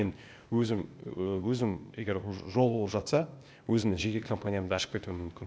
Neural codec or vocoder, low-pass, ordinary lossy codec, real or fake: codec, 16 kHz, 0.8 kbps, ZipCodec; none; none; fake